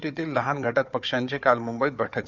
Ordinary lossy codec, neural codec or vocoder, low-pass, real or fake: none; codec, 16 kHz, 4 kbps, FunCodec, trained on Chinese and English, 50 frames a second; 7.2 kHz; fake